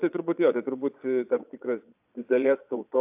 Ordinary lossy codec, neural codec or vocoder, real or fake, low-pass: AAC, 32 kbps; vocoder, 22.05 kHz, 80 mel bands, Vocos; fake; 3.6 kHz